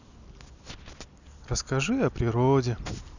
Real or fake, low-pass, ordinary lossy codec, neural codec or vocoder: real; 7.2 kHz; none; none